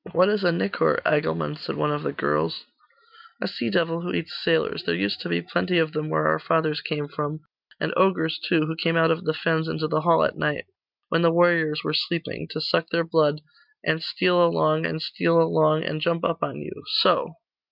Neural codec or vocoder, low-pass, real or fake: none; 5.4 kHz; real